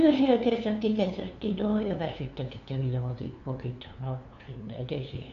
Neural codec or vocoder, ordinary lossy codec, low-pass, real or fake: codec, 16 kHz, 2 kbps, FunCodec, trained on LibriTTS, 25 frames a second; none; 7.2 kHz; fake